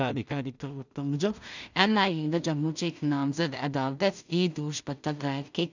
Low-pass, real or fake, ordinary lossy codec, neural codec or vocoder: 7.2 kHz; fake; none; codec, 16 kHz in and 24 kHz out, 0.4 kbps, LongCat-Audio-Codec, two codebook decoder